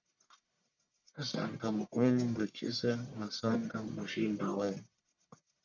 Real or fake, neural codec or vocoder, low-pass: fake; codec, 44.1 kHz, 1.7 kbps, Pupu-Codec; 7.2 kHz